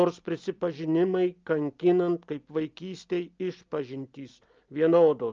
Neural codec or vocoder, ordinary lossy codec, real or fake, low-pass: none; Opus, 24 kbps; real; 7.2 kHz